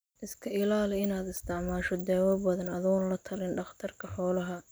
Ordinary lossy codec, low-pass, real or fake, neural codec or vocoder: none; none; real; none